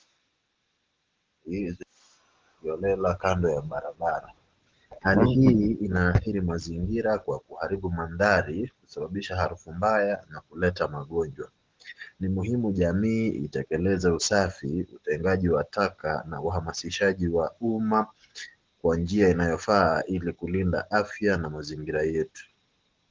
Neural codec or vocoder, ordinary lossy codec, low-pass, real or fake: none; Opus, 16 kbps; 7.2 kHz; real